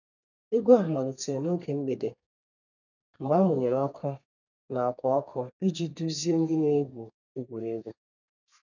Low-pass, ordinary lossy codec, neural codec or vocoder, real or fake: 7.2 kHz; none; codec, 32 kHz, 1.9 kbps, SNAC; fake